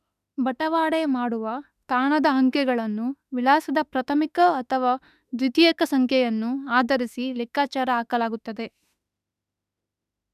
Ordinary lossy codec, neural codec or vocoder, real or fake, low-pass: none; autoencoder, 48 kHz, 32 numbers a frame, DAC-VAE, trained on Japanese speech; fake; 14.4 kHz